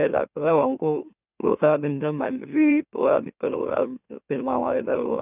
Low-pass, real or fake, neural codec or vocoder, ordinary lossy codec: 3.6 kHz; fake; autoencoder, 44.1 kHz, a latent of 192 numbers a frame, MeloTTS; none